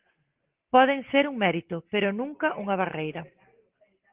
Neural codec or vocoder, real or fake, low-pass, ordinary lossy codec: none; real; 3.6 kHz; Opus, 16 kbps